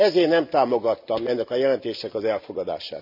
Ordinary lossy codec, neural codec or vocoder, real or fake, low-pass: MP3, 48 kbps; none; real; 5.4 kHz